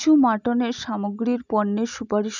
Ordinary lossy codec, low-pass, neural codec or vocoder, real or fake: none; 7.2 kHz; none; real